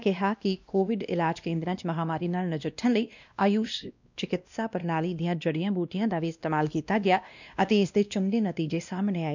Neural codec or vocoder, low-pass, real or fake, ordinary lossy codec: codec, 16 kHz, 1 kbps, X-Codec, WavLM features, trained on Multilingual LibriSpeech; 7.2 kHz; fake; none